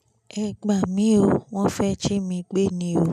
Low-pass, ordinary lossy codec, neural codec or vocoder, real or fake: 14.4 kHz; none; none; real